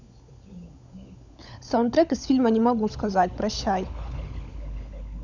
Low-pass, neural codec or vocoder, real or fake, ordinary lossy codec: 7.2 kHz; codec, 16 kHz, 16 kbps, FunCodec, trained on LibriTTS, 50 frames a second; fake; none